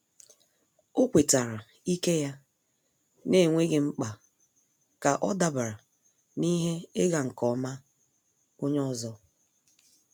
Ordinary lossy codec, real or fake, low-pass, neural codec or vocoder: none; real; none; none